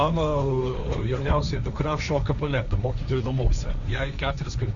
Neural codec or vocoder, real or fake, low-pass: codec, 16 kHz, 1.1 kbps, Voila-Tokenizer; fake; 7.2 kHz